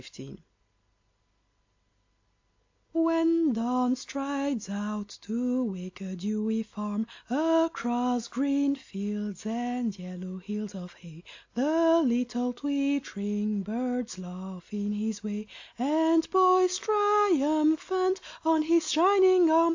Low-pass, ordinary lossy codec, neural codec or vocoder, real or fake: 7.2 kHz; AAC, 48 kbps; none; real